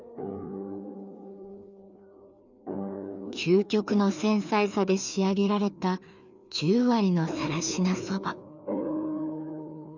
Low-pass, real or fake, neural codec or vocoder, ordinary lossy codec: 7.2 kHz; fake; codec, 16 kHz, 2 kbps, FreqCodec, larger model; none